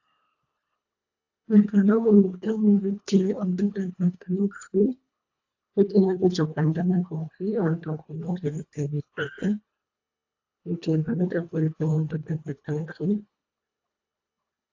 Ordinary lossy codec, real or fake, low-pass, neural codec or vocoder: AAC, 48 kbps; fake; 7.2 kHz; codec, 24 kHz, 1.5 kbps, HILCodec